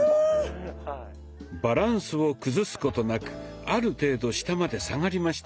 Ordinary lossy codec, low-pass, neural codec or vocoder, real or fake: none; none; none; real